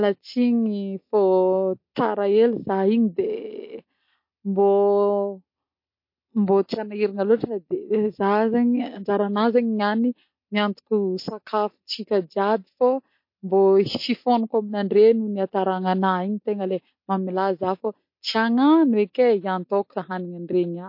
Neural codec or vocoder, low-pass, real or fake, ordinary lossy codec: none; 5.4 kHz; real; MP3, 32 kbps